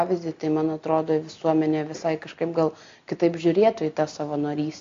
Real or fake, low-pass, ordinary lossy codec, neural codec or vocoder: real; 7.2 kHz; AAC, 48 kbps; none